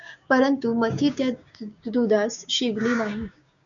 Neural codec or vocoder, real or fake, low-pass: codec, 16 kHz, 6 kbps, DAC; fake; 7.2 kHz